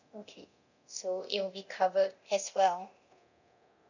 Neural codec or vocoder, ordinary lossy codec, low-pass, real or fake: codec, 24 kHz, 0.9 kbps, DualCodec; none; 7.2 kHz; fake